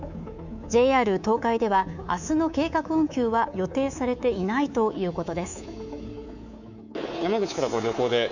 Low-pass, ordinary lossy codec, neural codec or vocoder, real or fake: 7.2 kHz; none; codec, 24 kHz, 3.1 kbps, DualCodec; fake